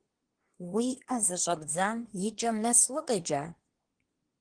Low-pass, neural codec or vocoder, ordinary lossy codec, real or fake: 9.9 kHz; codec, 24 kHz, 1 kbps, SNAC; Opus, 16 kbps; fake